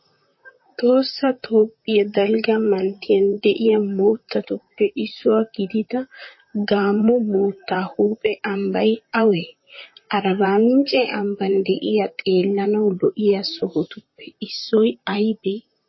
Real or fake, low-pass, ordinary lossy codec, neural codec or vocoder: fake; 7.2 kHz; MP3, 24 kbps; vocoder, 44.1 kHz, 128 mel bands, Pupu-Vocoder